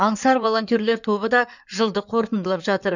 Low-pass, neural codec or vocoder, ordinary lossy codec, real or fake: 7.2 kHz; codec, 16 kHz in and 24 kHz out, 2.2 kbps, FireRedTTS-2 codec; none; fake